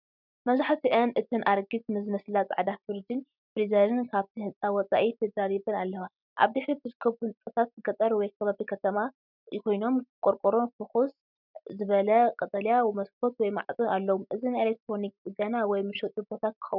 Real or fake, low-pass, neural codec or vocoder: real; 5.4 kHz; none